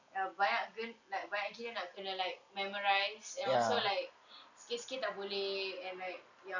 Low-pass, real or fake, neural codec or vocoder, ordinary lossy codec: 7.2 kHz; real; none; none